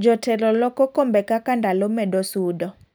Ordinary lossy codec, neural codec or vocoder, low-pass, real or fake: none; none; none; real